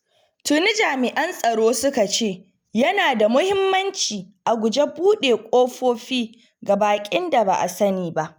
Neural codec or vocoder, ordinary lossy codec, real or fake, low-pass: none; none; real; none